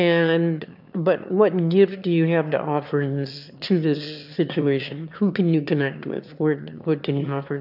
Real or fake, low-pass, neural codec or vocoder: fake; 5.4 kHz; autoencoder, 22.05 kHz, a latent of 192 numbers a frame, VITS, trained on one speaker